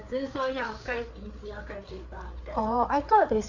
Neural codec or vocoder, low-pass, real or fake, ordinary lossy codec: codec, 16 kHz, 4 kbps, FreqCodec, larger model; 7.2 kHz; fake; none